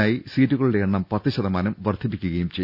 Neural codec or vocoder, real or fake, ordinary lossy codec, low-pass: none; real; none; 5.4 kHz